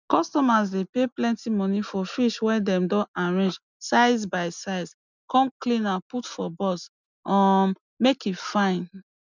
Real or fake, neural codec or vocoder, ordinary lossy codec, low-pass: real; none; none; 7.2 kHz